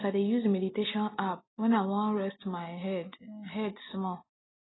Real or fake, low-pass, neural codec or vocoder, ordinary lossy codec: real; 7.2 kHz; none; AAC, 16 kbps